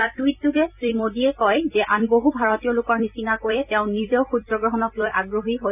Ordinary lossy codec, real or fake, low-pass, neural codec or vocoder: none; fake; 3.6 kHz; vocoder, 44.1 kHz, 128 mel bands every 256 samples, BigVGAN v2